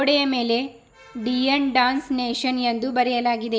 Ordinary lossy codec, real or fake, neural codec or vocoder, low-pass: none; real; none; none